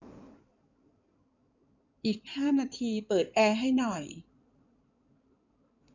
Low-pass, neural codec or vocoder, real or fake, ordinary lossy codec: 7.2 kHz; codec, 16 kHz in and 24 kHz out, 2.2 kbps, FireRedTTS-2 codec; fake; none